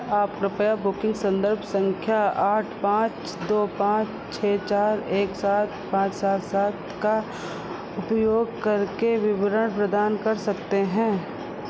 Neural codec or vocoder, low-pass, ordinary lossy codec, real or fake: none; none; none; real